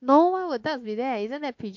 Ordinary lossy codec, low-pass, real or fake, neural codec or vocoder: MP3, 48 kbps; 7.2 kHz; fake; autoencoder, 48 kHz, 32 numbers a frame, DAC-VAE, trained on Japanese speech